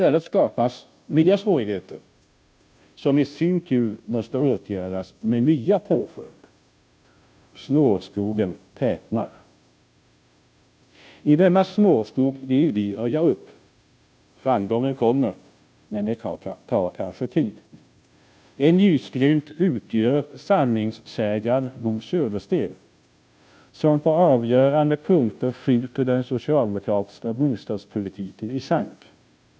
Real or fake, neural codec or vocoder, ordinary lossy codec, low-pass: fake; codec, 16 kHz, 0.5 kbps, FunCodec, trained on Chinese and English, 25 frames a second; none; none